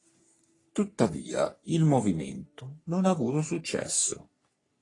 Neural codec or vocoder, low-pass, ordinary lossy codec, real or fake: codec, 44.1 kHz, 3.4 kbps, Pupu-Codec; 10.8 kHz; AAC, 32 kbps; fake